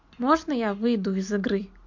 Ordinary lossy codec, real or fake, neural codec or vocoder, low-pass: MP3, 48 kbps; real; none; 7.2 kHz